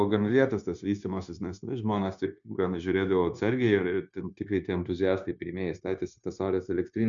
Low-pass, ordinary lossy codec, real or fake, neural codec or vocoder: 7.2 kHz; MP3, 96 kbps; fake; codec, 16 kHz, 0.9 kbps, LongCat-Audio-Codec